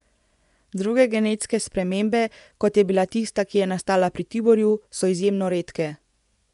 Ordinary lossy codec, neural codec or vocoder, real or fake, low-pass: none; vocoder, 24 kHz, 100 mel bands, Vocos; fake; 10.8 kHz